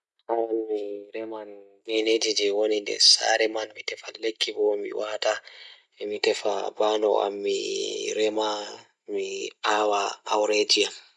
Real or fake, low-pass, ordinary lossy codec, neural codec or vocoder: real; none; none; none